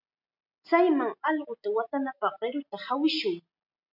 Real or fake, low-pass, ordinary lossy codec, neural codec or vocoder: real; 5.4 kHz; MP3, 48 kbps; none